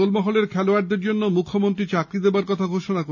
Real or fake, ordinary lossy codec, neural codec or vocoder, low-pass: real; none; none; 7.2 kHz